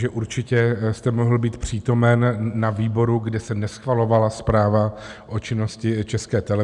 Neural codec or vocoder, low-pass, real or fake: none; 10.8 kHz; real